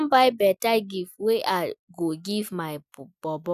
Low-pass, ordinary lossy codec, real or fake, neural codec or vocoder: 14.4 kHz; none; real; none